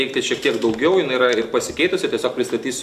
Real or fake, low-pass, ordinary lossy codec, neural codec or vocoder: real; 14.4 kHz; AAC, 96 kbps; none